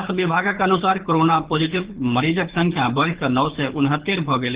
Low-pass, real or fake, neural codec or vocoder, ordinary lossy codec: 3.6 kHz; fake; codec, 24 kHz, 6 kbps, HILCodec; Opus, 16 kbps